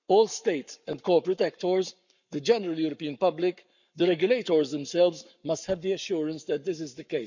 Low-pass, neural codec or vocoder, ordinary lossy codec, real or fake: 7.2 kHz; codec, 44.1 kHz, 7.8 kbps, Pupu-Codec; none; fake